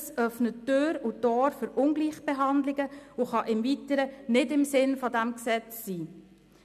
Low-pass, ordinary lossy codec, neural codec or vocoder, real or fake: 14.4 kHz; none; none; real